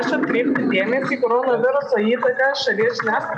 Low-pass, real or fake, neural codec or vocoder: 9.9 kHz; real; none